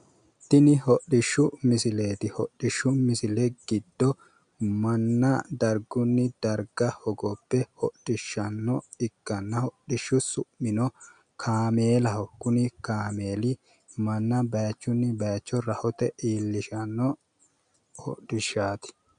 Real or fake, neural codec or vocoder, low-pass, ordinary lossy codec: real; none; 9.9 kHz; MP3, 96 kbps